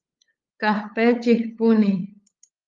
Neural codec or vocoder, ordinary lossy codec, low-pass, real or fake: codec, 16 kHz, 8 kbps, FunCodec, trained on LibriTTS, 25 frames a second; Opus, 32 kbps; 7.2 kHz; fake